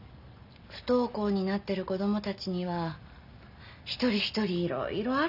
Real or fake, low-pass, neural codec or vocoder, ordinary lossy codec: real; 5.4 kHz; none; none